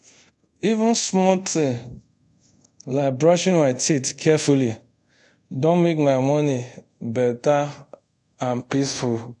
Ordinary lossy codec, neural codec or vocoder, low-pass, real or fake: none; codec, 24 kHz, 0.5 kbps, DualCodec; 10.8 kHz; fake